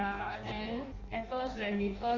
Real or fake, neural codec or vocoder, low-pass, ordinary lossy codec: fake; codec, 16 kHz in and 24 kHz out, 0.6 kbps, FireRedTTS-2 codec; 7.2 kHz; Opus, 64 kbps